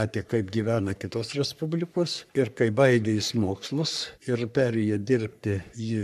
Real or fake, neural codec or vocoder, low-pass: fake; codec, 44.1 kHz, 3.4 kbps, Pupu-Codec; 14.4 kHz